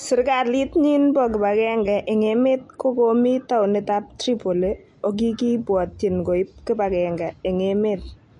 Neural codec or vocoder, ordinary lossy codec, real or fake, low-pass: none; MP3, 48 kbps; real; 10.8 kHz